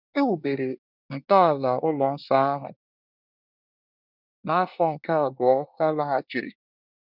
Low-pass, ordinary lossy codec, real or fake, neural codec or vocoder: 5.4 kHz; none; fake; codec, 24 kHz, 1 kbps, SNAC